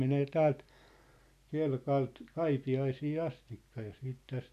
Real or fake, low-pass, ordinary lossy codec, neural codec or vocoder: real; 14.4 kHz; none; none